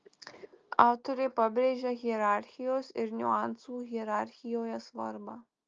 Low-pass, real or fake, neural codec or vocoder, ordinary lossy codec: 7.2 kHz; real; none; Opus, 24 kbps